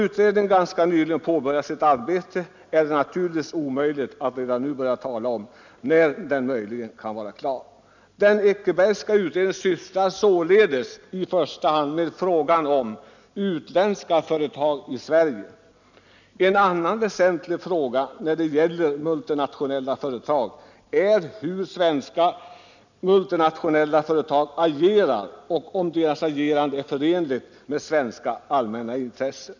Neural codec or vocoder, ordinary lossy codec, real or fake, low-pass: none; none; real; 7.2 kHz